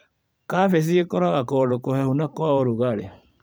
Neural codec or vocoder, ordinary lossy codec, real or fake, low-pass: vocoder, 44.1 kHz, 128 mel bands every 512 samples, BigVGAN v2; none; fake; none